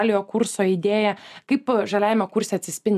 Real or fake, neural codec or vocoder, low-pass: real; none; 14.4 kHz